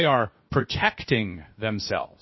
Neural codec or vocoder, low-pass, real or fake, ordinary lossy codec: codec, 16 kHz, 0.7 kbps, FocalCodec; 7.2 kHz; fake; MP3, 24 kbps